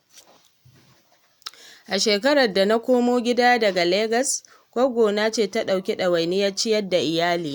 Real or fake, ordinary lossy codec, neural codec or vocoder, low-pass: real; none; none; none